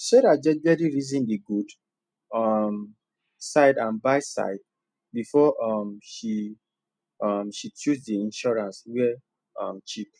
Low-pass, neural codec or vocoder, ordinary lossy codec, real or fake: 9.9 kHz; none; none; real